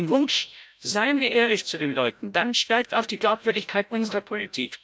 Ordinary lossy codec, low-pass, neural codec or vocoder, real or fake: none; none; codec, 16 kHz, 0.5 kbps, FreqCodec, larger model; fake